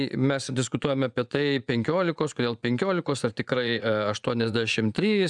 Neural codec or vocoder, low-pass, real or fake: vocoder, 24 kHz, 100 mel bands, Vocos; 10.8 kHz; fake